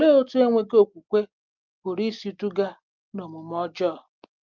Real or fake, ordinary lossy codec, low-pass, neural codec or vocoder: real; Opus, 32 kbps; 7.2 kHz; none